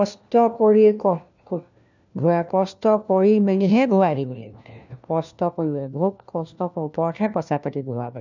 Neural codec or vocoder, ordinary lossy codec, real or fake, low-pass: codec, 16 kHz, 1 kbps, FunCodec, trained on LibriTTS, 50 frames a second; none; fake; 7.2 kHz